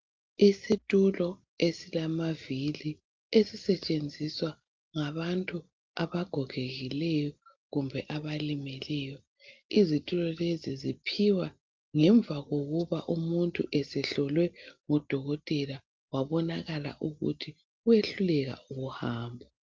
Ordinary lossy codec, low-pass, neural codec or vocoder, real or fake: Opus, 24 kbps; 7.2 kHz; none; real